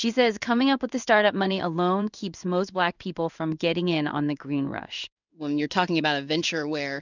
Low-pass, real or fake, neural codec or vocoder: 7.2 kHz; fake; codec, 16 kHz in and 24 kHz out, 1 kbps, XY-Tokenizer